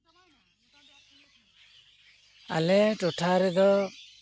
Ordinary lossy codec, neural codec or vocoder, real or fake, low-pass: none; none; real; none